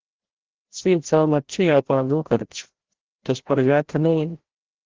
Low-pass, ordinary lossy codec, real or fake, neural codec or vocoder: 7.2 kHz; Opus, 16 kbps; fake; codec, 16 kHz, 0.5 kbps, FreqCodec, larger model